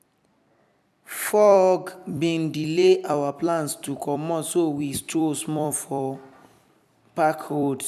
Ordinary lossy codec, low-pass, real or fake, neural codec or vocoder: none; 14.4 kHz; fake; vocoder, 44.1 kHz, 128 mel bands every 256 samples, BigVGAN v2